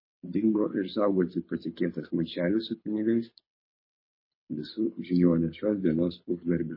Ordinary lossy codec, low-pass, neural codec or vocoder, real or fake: MP3, 24 kbps; 5.4 kHz; codec, 24 kHz, 6 kbps, HILCodec; fake